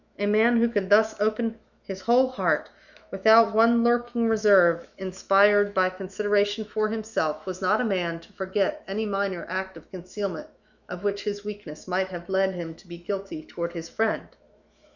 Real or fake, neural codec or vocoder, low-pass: fake; autoencoder, 48 kHz, 128 numbers a frame, DAC-VAE, trained on Japanese speech; 7.2 kHz